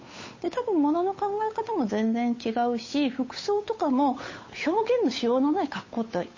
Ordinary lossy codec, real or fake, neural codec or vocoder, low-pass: MP3, 32 kbps; fake; codec, 16 kHz, 8 kbps, FunCodec, trained on Chinese and English, 25 frames a second; 7.2 kHz